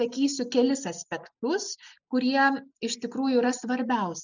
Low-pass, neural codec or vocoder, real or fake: 7.2 kHz; none; real